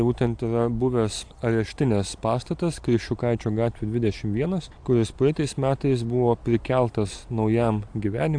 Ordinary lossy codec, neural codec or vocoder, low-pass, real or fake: AAC, 64 kbps; none; 9.9 kHz; real